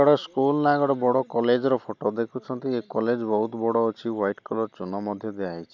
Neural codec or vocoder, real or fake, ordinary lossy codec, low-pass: none; real; none; 7.2 kHz